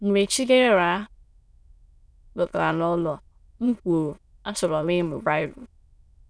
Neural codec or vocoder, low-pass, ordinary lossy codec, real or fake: autoencoder, 22.05 kHz, a latent of 192 numbers a frame, VITS, trained on many speakers; none; none; fake